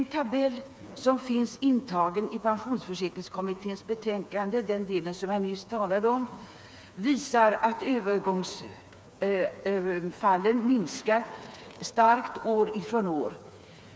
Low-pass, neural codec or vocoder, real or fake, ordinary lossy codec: none; codec, 16 kHz, 4 kbps, FreqCodec, smaller model; fake; none